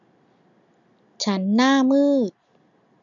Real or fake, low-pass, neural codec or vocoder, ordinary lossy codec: real; 7.2 kHz; none; none